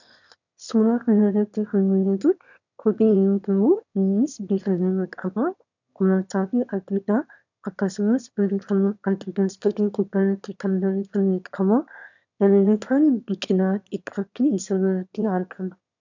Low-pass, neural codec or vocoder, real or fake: 7.2 kHz; autoencoder, 22.05 kHz, a latent of 192 numbers a frame, VITS, trained on one speaker; fake